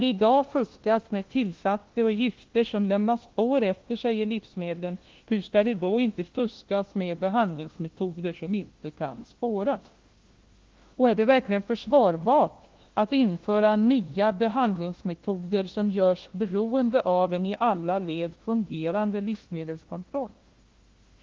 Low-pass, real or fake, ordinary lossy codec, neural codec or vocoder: 7.2 kHz; fake; Opus, 16 kbps; codec, 16 kHz, 1 kbps, FunCodec, trained on LibriTTS, 50 frames a second